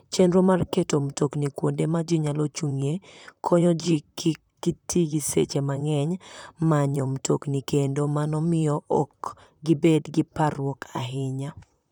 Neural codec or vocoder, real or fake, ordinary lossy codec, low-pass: vocoder, 44.1 kHz, 128 mel bands, Pupu-Vocoder; fake; none; 19.8 kHz